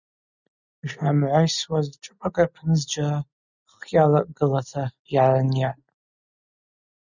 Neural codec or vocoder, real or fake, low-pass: none; real; 7.2 kHz